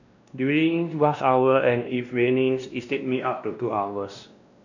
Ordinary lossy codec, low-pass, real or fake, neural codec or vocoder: none; 7.2 kHz; fake; codec, 16 kHz, 1 kbps, X-Codec, WavLM features, trained on Multilingual LibriSpeech